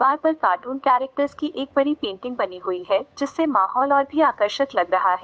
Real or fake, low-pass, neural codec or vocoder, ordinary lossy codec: fake; none; codec, 16 kHz, 8 kbps, FunCodec, trained on Chinese and English, 25 frames a second; none